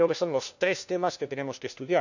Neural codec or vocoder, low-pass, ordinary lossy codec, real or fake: codec, 16 kHz, 1 kbps, FunCodec, trained on LibriTTS, 50 frames a second; 7.2 kHz; none; fake